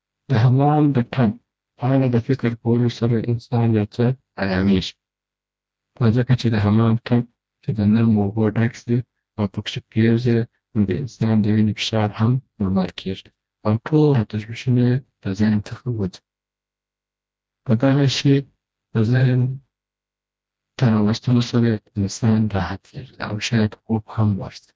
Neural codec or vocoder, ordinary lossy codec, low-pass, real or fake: codec, 16 kHz, 1 kbps, FreqCodec, smaller model; none; none; fake